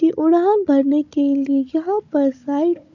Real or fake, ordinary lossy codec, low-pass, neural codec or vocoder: fake; none; 7.2 kHz; codec, 16 kHz, 16 kbps, FunCodec, trained on Chinese and English, 50 frames a second